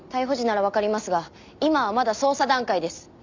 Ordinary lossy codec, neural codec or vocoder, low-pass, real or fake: MP3, 64 kbps; none; 7.2 kHz; real